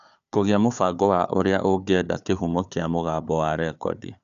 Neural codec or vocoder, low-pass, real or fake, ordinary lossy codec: codec, 16 kHz, 4 kbps, FunCodec, trained on Chinese and English, 50 frames a second; 7.2 kHz; fake; none